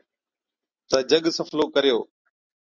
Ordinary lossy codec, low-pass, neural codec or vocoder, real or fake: Opus, 64 kbps; 7.2 kHz; none; real